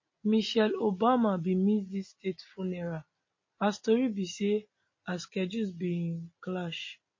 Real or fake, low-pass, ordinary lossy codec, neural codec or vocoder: real; 7.2 kHz; MP3, 32 kbps; none